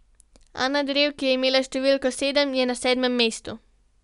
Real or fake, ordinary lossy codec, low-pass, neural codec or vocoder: real; none; 10.8 kHz; none